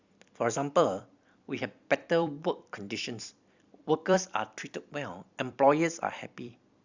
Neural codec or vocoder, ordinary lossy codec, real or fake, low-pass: vocoder, 44.1 kHz, 128 mel bands every 256 samples, BigVGAN v2; Opus, 64 kbps; fake; 7.2 kHz